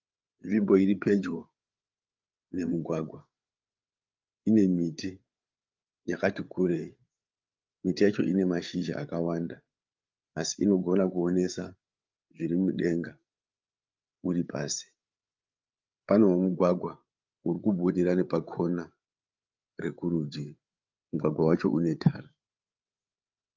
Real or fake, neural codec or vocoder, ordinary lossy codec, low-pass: fake; codec, 16 kHz, 8 kbps, FreqCodec, larger model; Opus, 32 kbps; 7.2 kHz